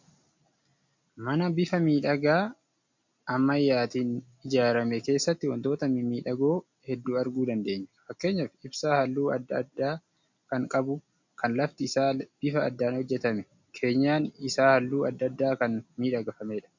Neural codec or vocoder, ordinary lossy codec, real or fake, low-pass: none; MP3, 48 kbps; real; 7.2 kHz